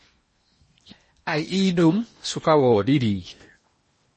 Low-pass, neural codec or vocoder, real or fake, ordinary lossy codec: 10.8 kHz; codec, 16 kHz in and 24 kHz out, 0.8 kbps, FocalCodec, streaming, 65536 codes; fake; MP3, 32 kbps